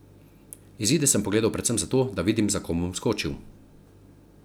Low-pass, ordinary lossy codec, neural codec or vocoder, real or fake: none; none; none; real